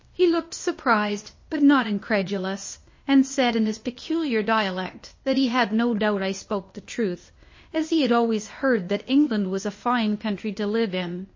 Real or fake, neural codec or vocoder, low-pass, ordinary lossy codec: fake; codec, 16 kHz, 0.8 kbps, ZipCodec; 7.2 kHz; MP3, 32 kbps